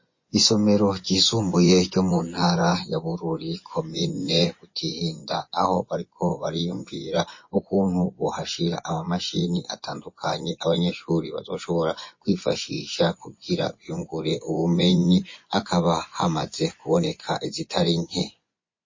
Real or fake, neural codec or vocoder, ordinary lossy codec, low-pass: fake; vocoder, 24 kHz, 100 mel bands, Vocos; MP3, 32 kbps; 7.2 kHz